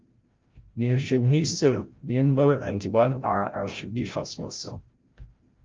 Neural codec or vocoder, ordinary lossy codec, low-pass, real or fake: codec, 16 kHz, 0.5 kbps, FreqCodec, larger model; Opus, 32 kbps; 7.2 kHz; fake